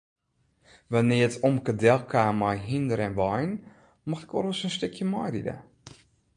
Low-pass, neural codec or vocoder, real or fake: 9.9 kHz; none; real